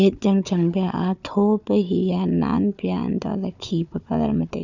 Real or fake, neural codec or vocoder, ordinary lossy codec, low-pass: fake; codec, 16 kHz, 4 kbps, FunCodec, trained on Chinese and English, 50 frames a second; none; 7.2 kHz